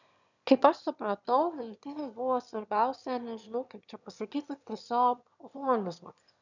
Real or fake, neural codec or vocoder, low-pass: fake; autoencoder, 22.05 kHz, a latent of 192 numbers a frame, VITS, trained on one speaker; 7.2 kHz